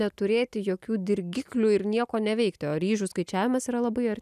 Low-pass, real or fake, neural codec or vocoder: 14.4 kHz; real; none